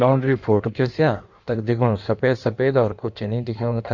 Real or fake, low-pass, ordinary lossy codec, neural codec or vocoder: fake; 7.2 kHz; Opus, 64 kbps; codec, 16 kHz in and 24 kHz out, 1.1 kbps, FireRedTTS-2 codec